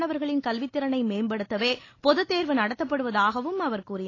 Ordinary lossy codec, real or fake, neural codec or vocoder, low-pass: AAC, 32 kbps; real; none; 7.2 kHz